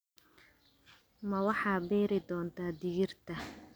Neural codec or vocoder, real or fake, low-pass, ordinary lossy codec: none; real; none; none